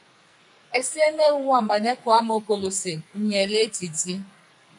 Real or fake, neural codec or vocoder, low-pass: fake; codec, 44.1 kHz, 2.6 kbps, SNAC; 10.8 kHz